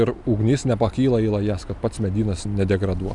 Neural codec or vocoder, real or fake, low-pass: none; real; 10.8 kHz